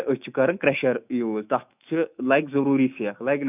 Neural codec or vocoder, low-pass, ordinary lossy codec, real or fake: autoencoder, 48 kHz, 128 numbers a frame, DAC-VAE, trained on Japanese speech; 3.6 kHz; none; fake